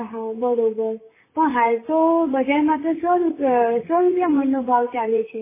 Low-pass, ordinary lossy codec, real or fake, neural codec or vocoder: 3.6 kHz; MP3, 16 kbps; fake; vocoder, 44.1 kHz, 128 mel bands, Pupu-Vocoder